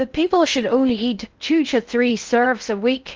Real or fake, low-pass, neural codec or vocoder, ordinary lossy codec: fake; 7.2 kHz; codec, 16 kHz in and 24 kHz out, 0.6 kbps, FocalCodec, streaming, 4096 codes; Opus, 24 kbps